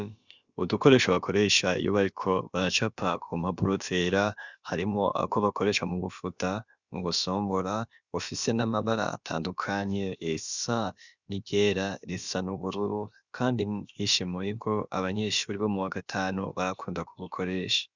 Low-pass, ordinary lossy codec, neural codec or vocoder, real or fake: 7.2 kHz; Opus, 64 kbps; codec, 16 kHz, about 1 kbps, DyCAST, with the encoder's durations; fake